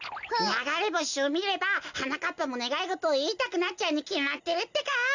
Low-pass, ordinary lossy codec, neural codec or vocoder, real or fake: 7.2 kHz; none; none; real